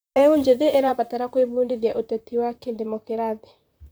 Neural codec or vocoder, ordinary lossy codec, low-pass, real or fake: vocoder, 44.1 kHz, 128 mel bands, Pupu-Vocoder; none; none; fake